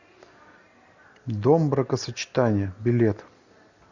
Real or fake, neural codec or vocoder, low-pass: real; none; 7.2 kHz